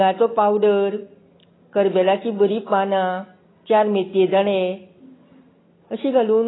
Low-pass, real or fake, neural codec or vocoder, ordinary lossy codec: 7.2 kHz; real; none; AAC, 16 kbps